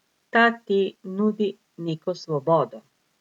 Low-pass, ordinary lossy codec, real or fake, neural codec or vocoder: 19.8 kHz; MP3, 96 kbps; real; none